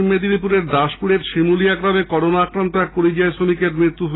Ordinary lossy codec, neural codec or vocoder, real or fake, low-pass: AAC, 16 kbps; none; real; 7.2 kHz